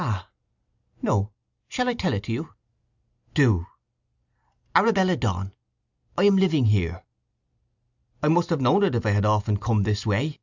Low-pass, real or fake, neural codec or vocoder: 7.2 kHz; real; none